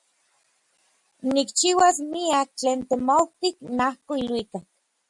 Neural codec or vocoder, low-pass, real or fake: none; 10.8 kHz; real